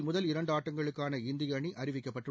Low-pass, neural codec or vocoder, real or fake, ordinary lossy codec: none; none; real; none